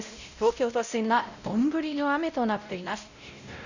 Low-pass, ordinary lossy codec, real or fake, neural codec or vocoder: 7.2 kHz; none; fake; codec, 16 kHz, 0.5 kbps, X-Codec, WavLM features, trained on Multilingual LibriSpeech